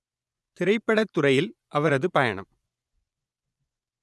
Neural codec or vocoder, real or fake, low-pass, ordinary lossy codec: vocoder, 24 kHz, 100 mel bands, Vocos; fake; none; none